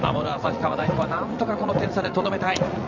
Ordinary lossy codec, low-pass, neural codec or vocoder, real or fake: none; 7.2 kHz; vocoder, 44.1 kHz, 80 mel bands, Vocos; fake